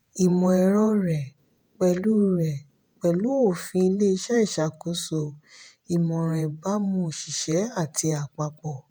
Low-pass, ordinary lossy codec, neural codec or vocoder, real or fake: none; none; vocoder, 48 kHz, 128 mel bands, Vocos; fake